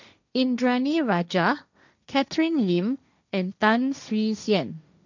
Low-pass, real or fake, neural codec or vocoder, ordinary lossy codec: 7.2 kHz; fake; codec, 16 kHz, 1.1 kbps, Voila-Tokenizer; none